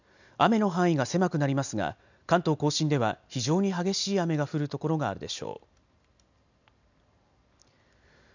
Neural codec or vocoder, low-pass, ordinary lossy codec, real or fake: none; 7.2 kHz; none; real